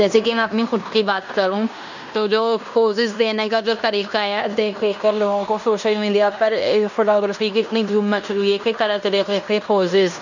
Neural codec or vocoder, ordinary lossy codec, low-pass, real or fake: codec, 16 kHz in and 24 kHz out, 0.9 kbps, LongCat-Audio-Codec, fine tuned four codebook decoder; none; 7.2 kHz; fake